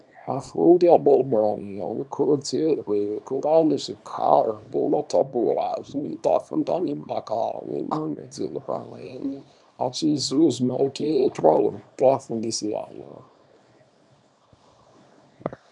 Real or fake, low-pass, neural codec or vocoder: fake; 10.8 kHz; codec, 24 kHz, 0.9 kbps, WavTokenizer, small release